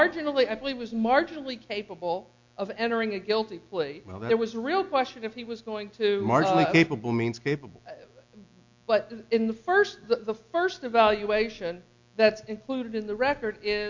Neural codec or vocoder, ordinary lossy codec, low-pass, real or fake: none; MP3, 64 kbps; 7.2 kHz; real